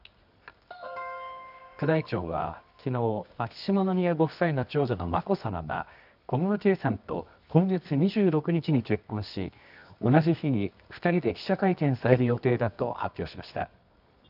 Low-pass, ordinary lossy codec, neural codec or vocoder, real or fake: 5.4 kHz; none; codec, 24 kHz, 0.9 kbps, WavTokenizer, medium music audio release; fake